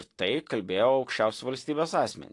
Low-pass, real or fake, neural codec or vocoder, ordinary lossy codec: 10.8 kHz; real; none; AAC, 48 kbps